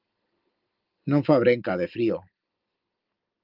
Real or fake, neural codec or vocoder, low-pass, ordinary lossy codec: real; none; 5.4 kHz; Opus, 32 kbps